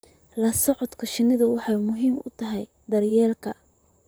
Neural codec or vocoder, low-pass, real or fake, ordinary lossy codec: vocoder, 44.1 kHz, 128 mel bands, Pupu-Vocoder; none; fake; none